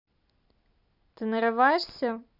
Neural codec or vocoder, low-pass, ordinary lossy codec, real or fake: none; 5.4 kHz; none; real